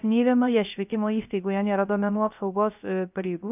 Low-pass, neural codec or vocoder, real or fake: 3.6 kHz; codec, 16 kHz, 0.3 kbps, FocalCodec; fake